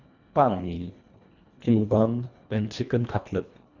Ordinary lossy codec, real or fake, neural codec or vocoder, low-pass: none; fake; codec, 24 kHz, 1.5 kbps, HILCodec; 7.2 kHz